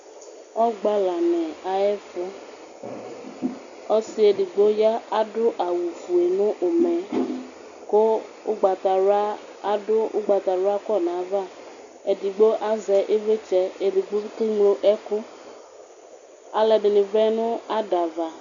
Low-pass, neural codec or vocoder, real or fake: 7.2 kHz; none; real